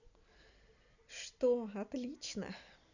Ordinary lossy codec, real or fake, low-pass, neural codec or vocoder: none; real; 7.2 kHz; none